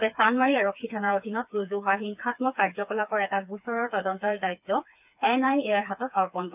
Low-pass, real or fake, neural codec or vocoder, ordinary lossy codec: 3.6 kHz; fake; codec, 16 kHz, 4 kbps, FreqCodec, smaller model; none